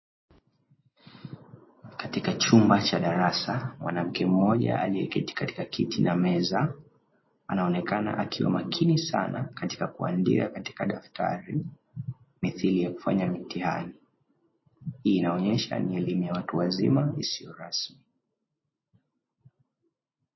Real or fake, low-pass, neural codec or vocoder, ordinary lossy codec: real; 7.2 kHz; none; MP3, 24 kbps